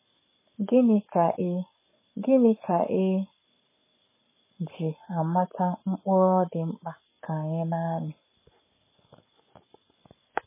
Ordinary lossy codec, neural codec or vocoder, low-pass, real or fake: MP3, 16 kbps; codec, 44.1 kHz, 7.8 kbps, Pupu-Codec; 3.6 kHz; fake